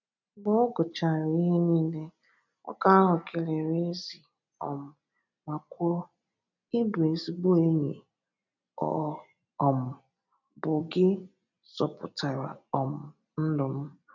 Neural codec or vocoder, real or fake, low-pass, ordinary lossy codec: none; real; 7.2 kHz; none